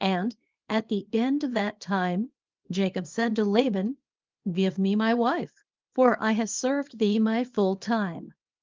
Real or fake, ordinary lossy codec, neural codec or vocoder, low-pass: fake; Opus, 32 kbps; codec, 24 kHz, 0.9 kbps, WavTokenizer, small release; 7.2 kHz